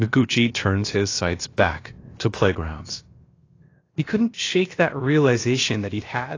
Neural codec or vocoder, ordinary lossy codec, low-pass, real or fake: codec, 16 kHz, about 1 kbps, DyCAST, with the encoder's durations; AAC, 32 kbps; 7.2 kHz; fake